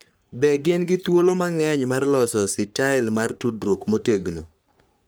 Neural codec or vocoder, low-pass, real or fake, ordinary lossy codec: codec, 44.1 kHz, 3.4 kbps, Pupu-Codec; none; fake; none